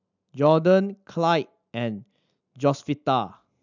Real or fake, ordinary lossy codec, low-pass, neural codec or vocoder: real; none; 7.2 kHz; none